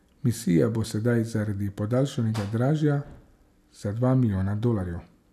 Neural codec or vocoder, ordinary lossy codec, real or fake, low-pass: none; none; real; 14.4 kHz